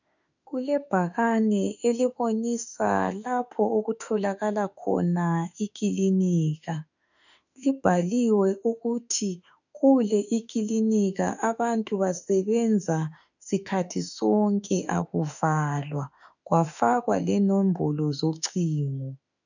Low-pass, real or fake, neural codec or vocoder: 7.2 kHz; fake; autoencoder, 48 kHz, 32 numbers a frame, DAC-VAE, trained on Japanese speech